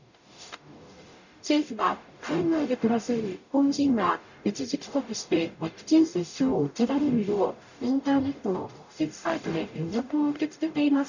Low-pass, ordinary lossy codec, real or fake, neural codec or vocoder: 7.2 kHz; none; fake; codec, 44.1 kHz, 0.9 kbps, DAC